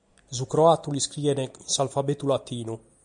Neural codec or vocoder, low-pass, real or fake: none; 9.9 kHz; real